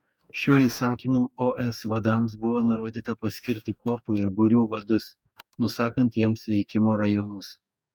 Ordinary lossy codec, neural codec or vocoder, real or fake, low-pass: MP3, 96 kbps; codec, 44.1 kHz, 2.6 kbps, DAC; fake; 19.8 kHz